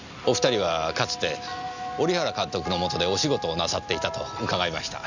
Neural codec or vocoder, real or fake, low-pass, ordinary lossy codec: none; real; 7.2 kHz; none